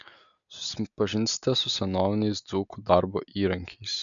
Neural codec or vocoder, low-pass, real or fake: none; 7.2 kHz; real